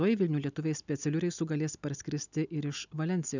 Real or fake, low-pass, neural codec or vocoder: real; 7.2 kHz; none